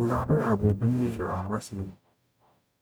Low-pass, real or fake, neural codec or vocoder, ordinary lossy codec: none; fake; codec, 44.1 kHz, 0.9 kbps, DAC; none